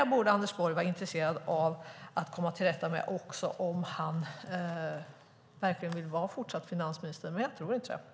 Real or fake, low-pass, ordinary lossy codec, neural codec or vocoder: real; none; none; none